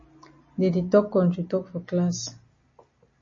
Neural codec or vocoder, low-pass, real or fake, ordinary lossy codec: none; 7.2 kHz; real; MP3, 32 kbps